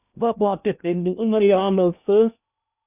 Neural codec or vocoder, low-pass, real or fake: codec, 16 kHz in and 24 kHz out, 0.6 kbps, FocalCodec, streaming, 2048 codes; 3.6 kHz; fake